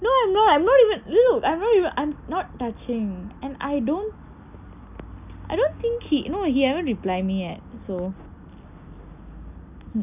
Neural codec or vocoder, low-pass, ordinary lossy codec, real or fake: none; 3.6 kHz; none; real